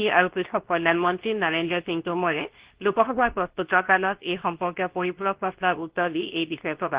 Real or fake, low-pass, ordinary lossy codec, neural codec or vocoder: fake; 3.6 kHz; Opus, 16 kbps; codec, 24 kHz, 0.9 kbps, WavTokenizer, medium speech release version 2